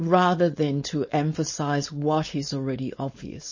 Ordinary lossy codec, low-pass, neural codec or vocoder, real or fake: MP3, 32 kbps; 7.2 kHz; codec, 16 kHz, 4.8 kbps, FACodec; fake